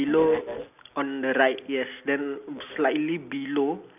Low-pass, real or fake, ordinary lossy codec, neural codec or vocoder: 3.6 kHz; real; none; none